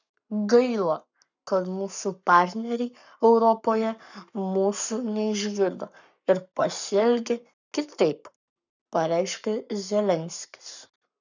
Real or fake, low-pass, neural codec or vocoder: fake; 7.2 kHz; codec, 44.1 kHz, 7.8 kbps, Pupu-Codec